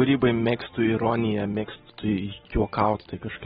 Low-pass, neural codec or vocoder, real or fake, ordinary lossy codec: 19.8 kHz; none; real; AAC, 16 kbps